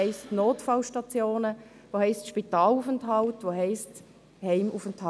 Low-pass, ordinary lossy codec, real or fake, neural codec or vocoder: none; none; real; none